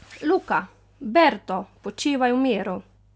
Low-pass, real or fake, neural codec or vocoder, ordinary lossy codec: none; real; none; none